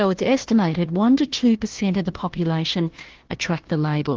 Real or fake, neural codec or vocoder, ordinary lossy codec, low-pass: fake; codec, 16 kHz, 1 kbps, FunCodec, trained on Chinese and English, 50 frames a second; Opus, 16 kbps; 7.2 kHz